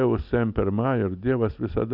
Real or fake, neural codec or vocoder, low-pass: real; none; 5.4 kHz